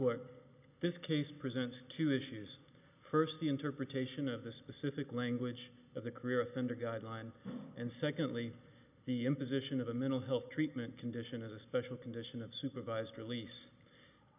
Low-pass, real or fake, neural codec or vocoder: 3.6 kHz; real; none